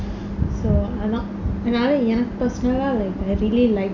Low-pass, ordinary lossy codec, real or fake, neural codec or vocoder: 7.2 kHz; none; real; none